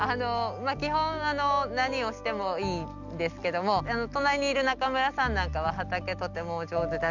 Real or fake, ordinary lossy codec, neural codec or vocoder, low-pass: real; none; none; 7.2 kHz